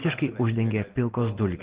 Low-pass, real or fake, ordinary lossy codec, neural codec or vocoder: 3.6 kHz; real; Opus, 16 kbps; none